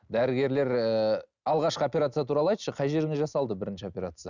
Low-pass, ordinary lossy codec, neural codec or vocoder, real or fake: 7.2 kHz; none; none; real